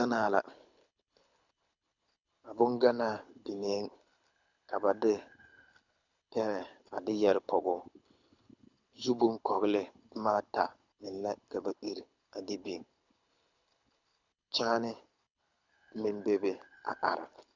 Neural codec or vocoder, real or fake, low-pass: codec, 24 kHz, 6 kbps, HILCodec; fake; 7.2 kHz